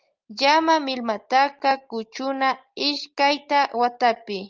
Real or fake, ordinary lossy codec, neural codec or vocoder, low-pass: real; Opus, 32 kbps; none; 7.2 kHz